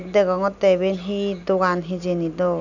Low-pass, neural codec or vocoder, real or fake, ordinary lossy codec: 7.2 kHz; none; real; none